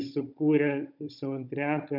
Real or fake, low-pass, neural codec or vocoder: fake; 5.4 kHz; codec, 16 kHz, 16 kbps, FunCodec, trained on Chinese and English, 50 frames a second